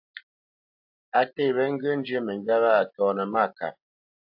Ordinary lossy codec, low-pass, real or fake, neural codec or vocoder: AAC, 48 kbps; 5.4 kHz; real; none